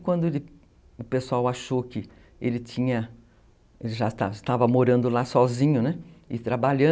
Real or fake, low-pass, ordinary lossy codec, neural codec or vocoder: real; none; none; none